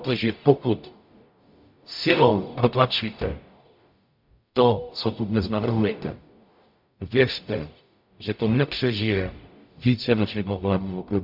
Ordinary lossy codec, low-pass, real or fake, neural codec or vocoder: MP3, 48 kbps; 5.4 kHz; fake; codec, 44.1 kHz, 0.9 kbps, DAC